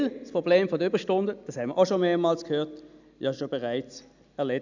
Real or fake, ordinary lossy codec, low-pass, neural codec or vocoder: real; none; 7.2 kHz; none